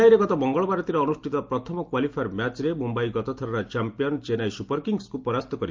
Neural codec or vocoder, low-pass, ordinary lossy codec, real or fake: none; 7.2 kHz; Opus, 24 kbps; real